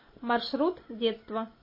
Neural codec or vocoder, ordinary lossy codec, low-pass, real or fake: none; MP3, 24 kbps; 5.4 kHz; real